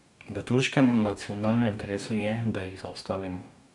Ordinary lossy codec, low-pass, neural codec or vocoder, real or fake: none; 10.8 kHz; codec, 44.1 kHz, 2.6 kbps, DAC; fake